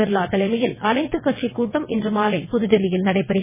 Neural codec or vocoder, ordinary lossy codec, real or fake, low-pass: vocoder, 22.05 kHz, 80 mel bands, WaveNeXt; MP3, 16 kbps; fake; 3.6 kHz